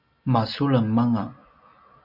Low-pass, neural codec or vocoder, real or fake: 5.4 kHz; none; real